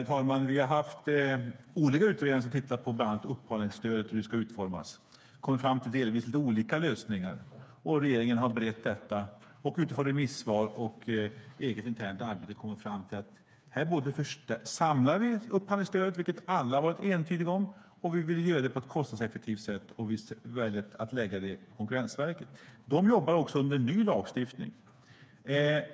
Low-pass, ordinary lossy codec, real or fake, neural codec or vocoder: none; none; fake; codec, 16 kHz, 4 kbps, FreqCodec, smaller model